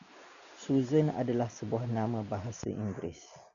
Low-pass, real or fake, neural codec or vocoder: 7.2 kHz; real; none